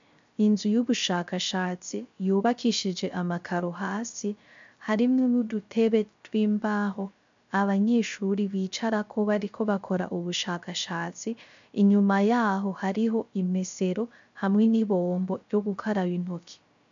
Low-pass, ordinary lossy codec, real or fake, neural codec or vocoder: 7.2 kHz; MP3, 64 kbps; fake; codec, 16 kHz, 0.3 kbps, FocalCodec